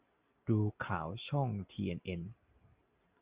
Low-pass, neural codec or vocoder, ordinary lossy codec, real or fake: 3.6 kHz; none; Opus, 64 kbps; real